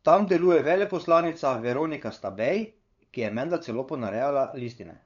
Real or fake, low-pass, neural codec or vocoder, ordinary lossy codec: fake; 7.2 kHz; codec, 16 kHz, 8 kbps, FunCodec, trained on LibriTTS, 25 frames a second; none